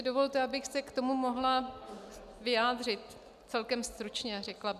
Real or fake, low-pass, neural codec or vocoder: real; 14.4 kHz; none